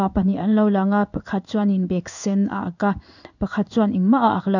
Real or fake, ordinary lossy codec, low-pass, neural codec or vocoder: fake; none; 7.2 kHz; codec, 16 kHz in and 24 kHz out, 1 kbps, XY-Tokenizer